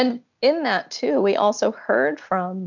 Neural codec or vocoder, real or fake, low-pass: none; real; 7.2 kHz